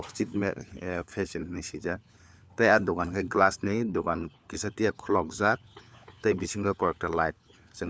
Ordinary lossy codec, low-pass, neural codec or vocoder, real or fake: none; none; codec, 16 kHz, 4 kbps, FunCodec, trained on LibriTTS, 50 frames a second; fake